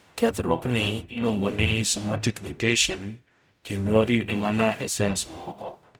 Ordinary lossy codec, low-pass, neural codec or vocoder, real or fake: none; none; codec, 44.1 kHz, 0.9 kbps, DAC; fake